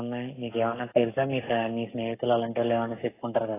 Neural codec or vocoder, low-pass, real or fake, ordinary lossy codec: codec, 44.1 kHz, 7.8 kbps, Pupu-Codec; 3.6 kHz; fake; AAC, 16 kbps